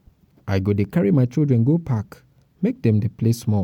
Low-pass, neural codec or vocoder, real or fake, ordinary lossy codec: 19.8 kHz; none; real; MP3, 96 kbps